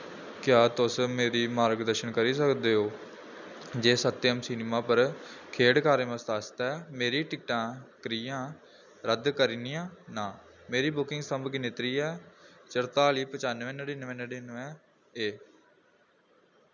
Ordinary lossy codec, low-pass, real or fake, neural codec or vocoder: none; 7.2 kHz; real; none